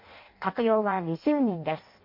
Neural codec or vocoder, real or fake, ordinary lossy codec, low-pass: codec, 16 kHz in and 24 kHz out, 0.6 kbps, FireRedTTS-2 codec; fake; MP3, 32 kbps; 5.4 kHz